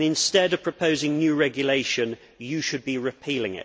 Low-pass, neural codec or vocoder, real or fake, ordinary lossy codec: none; none; real; none